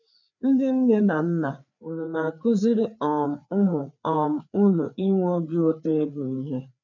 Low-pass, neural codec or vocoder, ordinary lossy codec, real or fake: 7.2 kHz; codec, 16 kHz, 4 kbps, FreqCodec, larger model; none; fake